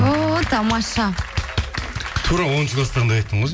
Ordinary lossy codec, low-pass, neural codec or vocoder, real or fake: none; none; none; real